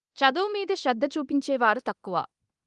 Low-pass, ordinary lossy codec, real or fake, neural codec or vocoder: 10.8 kHz; Opus, 24 kbps; fake; codec, 24 kHz, 0.9 kbps, DualCodec